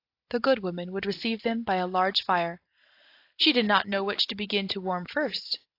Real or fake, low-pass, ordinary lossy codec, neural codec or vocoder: real; 5.4 kHz; AAC, 32 kbps; none